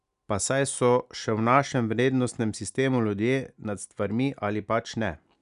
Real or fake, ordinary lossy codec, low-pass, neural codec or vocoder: real; none; 10.8 kHz; none